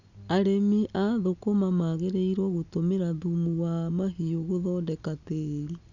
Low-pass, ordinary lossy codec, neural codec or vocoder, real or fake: 7.2 kHz; none; none; real